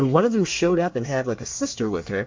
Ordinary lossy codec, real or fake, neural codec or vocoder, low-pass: MP3, 48 kbps; fake; codec, 24 kHz, 1 kbps, SNAC; 7.2 kHz